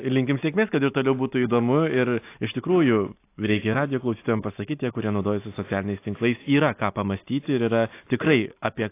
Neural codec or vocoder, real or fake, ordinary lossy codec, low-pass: none; real; AAC, 24 kbps; 3.6 kHz